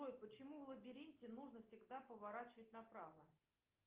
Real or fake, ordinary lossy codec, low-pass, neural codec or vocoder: real; Opus, 32 kbps; 3.6 kHz; none